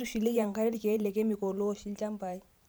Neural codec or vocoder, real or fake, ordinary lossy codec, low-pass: vocoder, 44.1 kHz, 128 mel bands every 512 samples, BigVGAN v2; fake; none; none